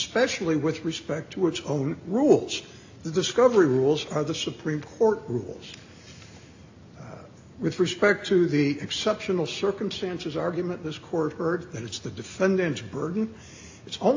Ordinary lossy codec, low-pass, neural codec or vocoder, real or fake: AAC, 48 kbps; 7.2 kHz; none; real